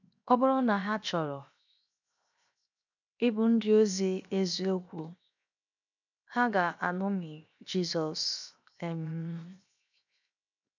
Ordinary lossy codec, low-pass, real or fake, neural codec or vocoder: none; 7.2 kHz; fake; codec, 16 kHz, 0.7 kbps, FocalCodec